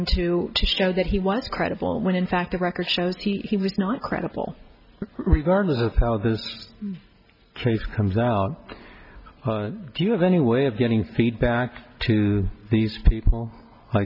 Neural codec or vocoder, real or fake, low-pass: none; real; 5.4 kHz